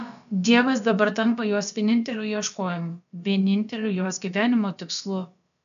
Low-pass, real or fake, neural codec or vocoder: 7.2 kHz; fake; codec, 16 kHz, about 1 kbps, DyCAST, with the encoder's durations